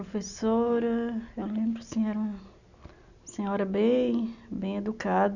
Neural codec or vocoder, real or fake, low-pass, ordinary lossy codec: none; real; 7.2 kHz; none